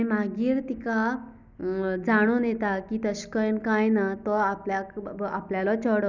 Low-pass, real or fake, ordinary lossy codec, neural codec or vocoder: 7.2 kHz; real; none; none